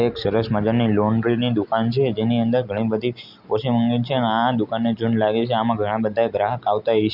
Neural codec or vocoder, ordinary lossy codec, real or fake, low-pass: none; none; real; 5.4 kHz